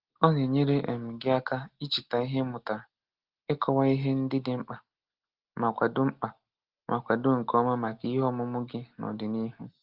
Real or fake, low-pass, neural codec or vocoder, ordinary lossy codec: real; 5.4 kHz; none; Opus, 16 kbps